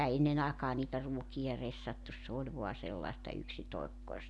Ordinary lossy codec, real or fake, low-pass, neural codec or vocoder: none; real; none; none